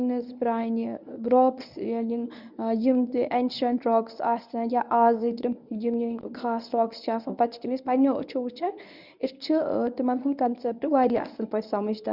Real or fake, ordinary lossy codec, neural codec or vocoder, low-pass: fake; none; codec, 24 kHz, 0.9 kbps, WavTokenizer, medium speech release version 1; 5.4 kHz